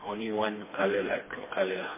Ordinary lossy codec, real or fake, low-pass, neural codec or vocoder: MP3, 16 kbps; fake; 3.6 kHz; codec, 16 kHz, 2 kbps, FreqCodec, smaller model